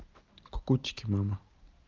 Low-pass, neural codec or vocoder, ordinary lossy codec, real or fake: 7.2 kHz; none; Opus, 32 kbps; real